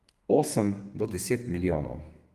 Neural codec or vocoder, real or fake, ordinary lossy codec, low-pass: codec, 32 kHz, 1.9 kbps, SNAC; fake; Opus, 24 kbps; 14.4 kHz